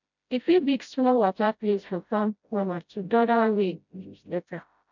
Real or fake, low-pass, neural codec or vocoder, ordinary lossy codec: fake; 7.2 kHz; codec, 16 kHz, 0.5 kbps, FreqCodec, smaller model; none